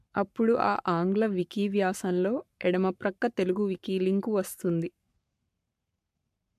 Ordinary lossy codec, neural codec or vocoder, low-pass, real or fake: MP3, 96 kbps; codec, 44.1 kHz, 7.8 kbps, Pupu-Codec; 14.4 kHz; fake